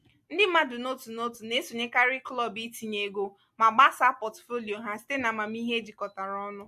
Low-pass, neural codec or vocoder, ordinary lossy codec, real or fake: 14.4 kHz; none; MP3, 64 kbps; real